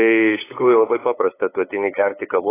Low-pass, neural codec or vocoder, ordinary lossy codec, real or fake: 3.6 kHz; codec, 16 kHz, 8 kbps, FunCodec, trained on LibriTTS, 25 frames a second; AAC, 16 kbps; fake